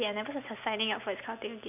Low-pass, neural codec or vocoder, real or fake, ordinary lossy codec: 3.6 kHz; none; real; none